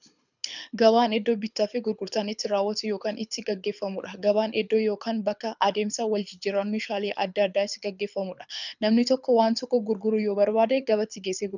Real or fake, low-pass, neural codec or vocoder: fake; 7.2 kHz; codec, 24 kHz, 6 kbps, HILCodec